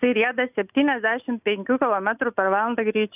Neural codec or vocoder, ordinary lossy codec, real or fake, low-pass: none; AAC, 32 kbps; real; 3.6 kHz